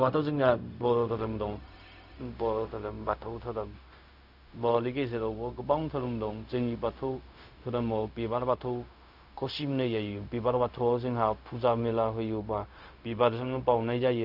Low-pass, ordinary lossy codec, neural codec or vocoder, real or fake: 5.4 kHz; none; codec, 16 kHz, 0.4 kbps, LongCat-Audio-Codec; fake